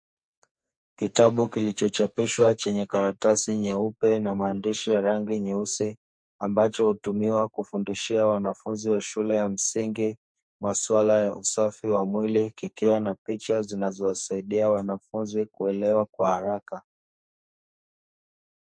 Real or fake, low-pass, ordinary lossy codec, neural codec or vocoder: fake; 9.9 kHz; MP3, 48 kbps; codec, 44.1 kHz, 2.6 kbps, SNAC